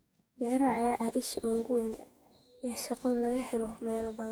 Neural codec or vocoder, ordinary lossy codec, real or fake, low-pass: codec, 44.1 kHz, 2.6 kbps, DAC; none; fake; none